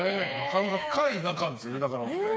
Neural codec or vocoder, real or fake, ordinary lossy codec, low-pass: codec, 16 kHz, 4 kbps, FreqCodec, smaller model; fake; none; none